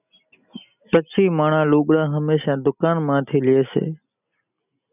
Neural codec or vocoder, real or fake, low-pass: none; real; 3.6 kHz